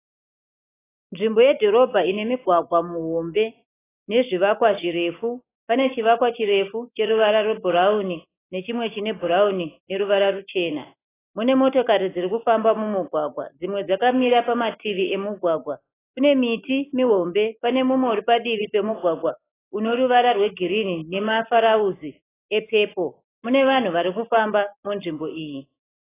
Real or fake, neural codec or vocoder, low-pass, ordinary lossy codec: real; none; 3.6 kHz; AAC, 16 kbps